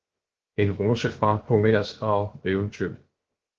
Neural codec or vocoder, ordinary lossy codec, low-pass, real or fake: codec, 16 kHz, 0.7 kbps, FocalCodec; Opus, 16 kbps; 7.2 kHz; fake